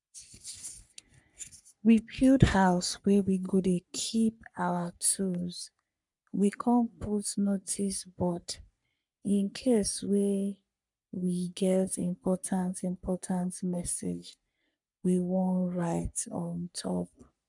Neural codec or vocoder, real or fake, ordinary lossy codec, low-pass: codec, 44.1 kHz, 3.4 kbps, Pupu-Codec; fake; none; 10.8 kHz